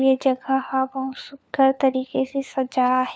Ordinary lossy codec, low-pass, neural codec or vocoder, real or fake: none; none; codec, 16 kHz, 4 kbps, FunCodec, trained on LibriTTS, 50 frames a second; fake